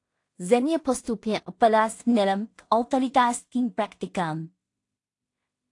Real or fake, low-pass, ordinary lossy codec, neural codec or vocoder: fake; 10.8 kHz; AAC, 48 kbps; codec, 16 kHz in and 24 kHz out, 0.4 kbps, LongCat-Audio-Codec, two codebook decoder